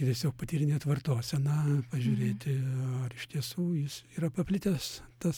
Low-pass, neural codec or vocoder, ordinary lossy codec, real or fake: 19.8 kHz; none; MP3, 96 kbps; real